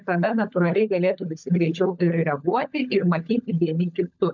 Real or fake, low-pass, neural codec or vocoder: fake; 7.2 kHz; codec, 16 kHz, 16 kbps, FunCodec, trained on LibriTTS, 50 frames a second